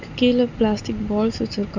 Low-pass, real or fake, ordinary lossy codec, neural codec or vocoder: 7.2 kHz; real; none; none